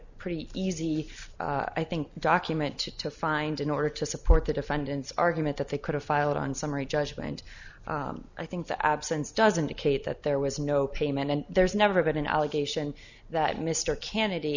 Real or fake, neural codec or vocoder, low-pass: real; none; 7.2 kHz